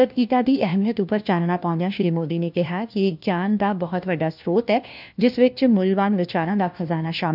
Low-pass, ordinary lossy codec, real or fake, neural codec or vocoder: 5.4 kHz; none; fake; codec, 16 kHz, 1 kbps, FunCodec, trained on LibriTTS, 50 frames a second